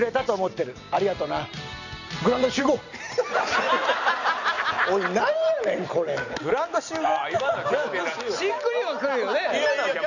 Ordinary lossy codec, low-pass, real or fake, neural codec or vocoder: none; 7.2 kHz; real; none